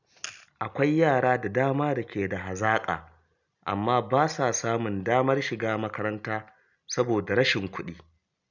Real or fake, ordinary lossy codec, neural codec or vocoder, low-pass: real; none; none; 7.2 kHz